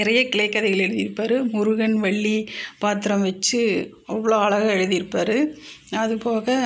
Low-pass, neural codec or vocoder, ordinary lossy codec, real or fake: none; none; none; real